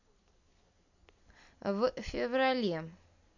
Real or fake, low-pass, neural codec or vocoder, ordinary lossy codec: real; 7.2 kHz; none; none